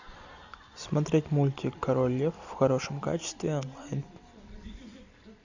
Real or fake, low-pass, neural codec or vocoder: real; 7.2 kHz; none